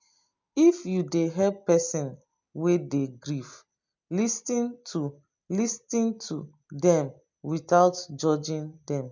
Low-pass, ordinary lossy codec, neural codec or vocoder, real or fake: 7.2 kHz; MP3, 64 kbps; none; real